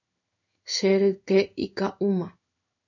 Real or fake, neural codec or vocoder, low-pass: fake; codec, 16 kHz in and 24 kHz out, 1 kbps, XY-Tokenizer; 7.2 kHz